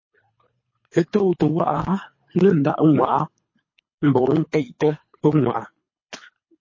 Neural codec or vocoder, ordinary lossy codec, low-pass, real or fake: codec, 24 kHz, 3 kbps, HILCodec; MP3, 32 kbps; 7.2 kHz; fake